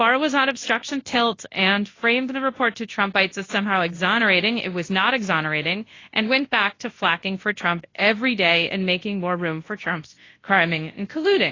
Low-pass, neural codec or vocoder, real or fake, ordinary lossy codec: 7.2 kHz; codec, 24 kHz, 0.9 kbps, WavTokenizer, large speech release; fake; AAC, 32 kbps